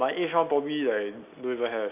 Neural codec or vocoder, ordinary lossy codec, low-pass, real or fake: none; none; 3.6 kHz; real